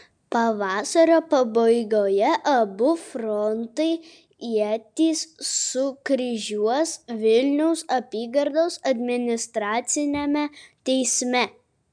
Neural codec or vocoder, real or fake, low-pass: none; real; 9.9 kHz